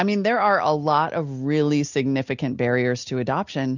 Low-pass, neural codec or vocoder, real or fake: 7.2 kHz; none; real